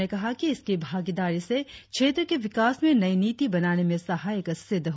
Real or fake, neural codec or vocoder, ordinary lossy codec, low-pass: real; none; none; none